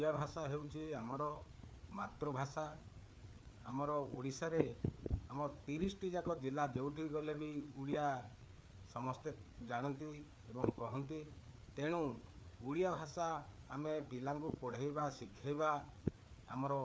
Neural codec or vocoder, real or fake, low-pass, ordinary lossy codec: codec, 16 kHz, 4 kbps, FreqCodec, larger model; fake; none; none